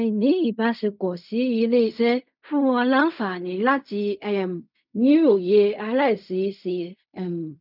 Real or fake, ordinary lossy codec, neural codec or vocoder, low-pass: fake; none; codec, 16 kHz in and 24 kHz out, 0.4 kbps, LongCat-Audio-Codec, fine tuned four codebook decoder; 5.4 kHz